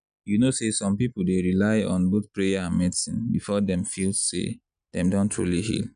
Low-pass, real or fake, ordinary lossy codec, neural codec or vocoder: 9.9 kHz; real; none; none